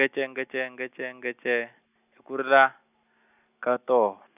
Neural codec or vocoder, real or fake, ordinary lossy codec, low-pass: none; real; none; 3.6 kHz